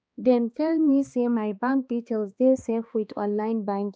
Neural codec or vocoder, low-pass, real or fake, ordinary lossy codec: codec, 16 kHz, 2 kbps, X-Codec, HuBERT features, trained on balanced general audio; none; fake; none